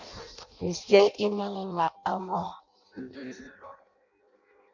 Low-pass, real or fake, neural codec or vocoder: 7.2 kHz; fake; codec, 16 kHz in and 24 kHz out, 0.6 kbps, FireRedTTS-2 codec